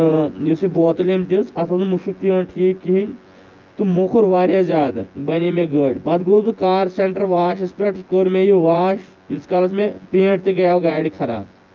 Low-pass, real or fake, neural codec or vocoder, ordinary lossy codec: 7.2 kHz; fake; vocoder, 24 kHz, 100 mel bands, Vocos; Opus, 32 kbps